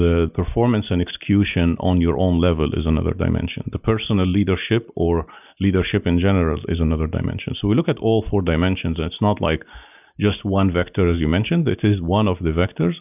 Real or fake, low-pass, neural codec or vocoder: real; 3.6 kHz; none